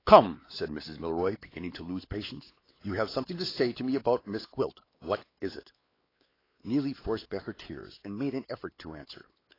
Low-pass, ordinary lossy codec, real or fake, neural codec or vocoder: 5.4 kHz; AAC, 24 kbps; fake; codec, 16 kHz, 4 kbps, X-Codec, WavLM features, trained on Multilingual LibriSpeech